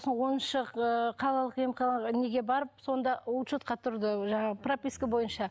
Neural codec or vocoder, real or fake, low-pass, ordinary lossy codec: none; real; none; none